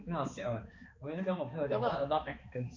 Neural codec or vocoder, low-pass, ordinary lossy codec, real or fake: codec, 16 kHz, 2 kbps, X-Codec, HuBERT features, trained on balanced general audio; 7.2 kHz; MP3, 48 kbps; fake